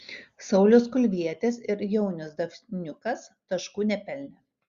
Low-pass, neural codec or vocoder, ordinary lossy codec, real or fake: 7.2 kHz; none; Opus, 64 kbps; real